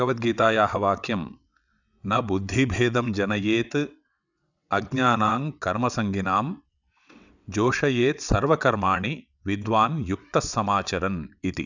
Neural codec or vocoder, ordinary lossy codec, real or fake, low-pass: vocoder, 22.05 kHz, 80 mel bands, WaveNeXt; none; fake; 7.2 kHz